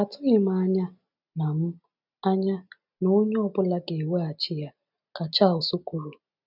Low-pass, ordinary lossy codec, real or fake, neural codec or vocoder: 5.4 kHz; none; real; none